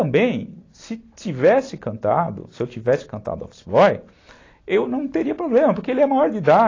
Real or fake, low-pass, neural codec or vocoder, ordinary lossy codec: real; 7.2 kHz; none; AAC, 32 kbps